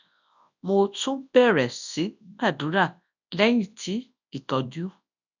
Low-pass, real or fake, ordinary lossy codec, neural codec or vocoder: 7.2 kHz; fake; none; codec, 24 kHz, 0.9 kbps, WavTokenizer, large speech release